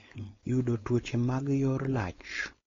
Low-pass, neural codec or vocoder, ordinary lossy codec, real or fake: 7.2 kHz; codec, 16 kHz, 4.8 kbps, FACodec; AAC, 32 kbps; fake